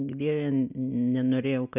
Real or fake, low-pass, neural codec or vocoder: real; 3.6 kHz; none